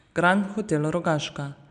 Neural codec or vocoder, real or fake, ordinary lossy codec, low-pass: none; real; none; 9.9 kHz